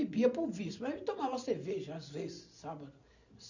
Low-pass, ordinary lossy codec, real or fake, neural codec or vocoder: 7.2 kHz; none; real; none